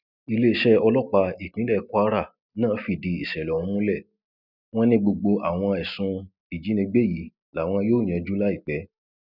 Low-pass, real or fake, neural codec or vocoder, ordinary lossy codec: 5.4 kHz; real; none; none